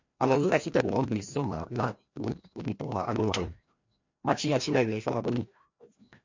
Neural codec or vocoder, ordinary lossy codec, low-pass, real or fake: codec, 16 kHz, 1 kbps, FreqCodec, larger model; MP3, 48 kbps; 7.2 kHz; fake